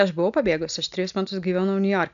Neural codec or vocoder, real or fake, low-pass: none; real; 7.2 kHz